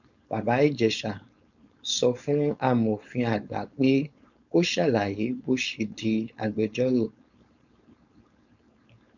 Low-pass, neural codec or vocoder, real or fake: 7.2 kHz; codec, 16 kHz, 4.8 kbps, FACodec; fake